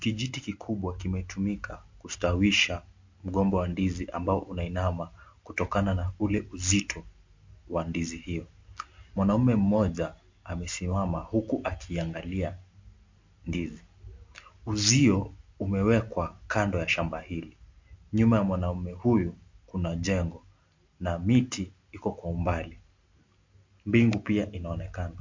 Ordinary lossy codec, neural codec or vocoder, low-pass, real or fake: MP3, 48 kbps; none; 7.2 kHz; real